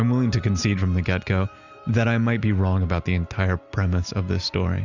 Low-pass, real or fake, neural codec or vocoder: 7.2 kHz; real; none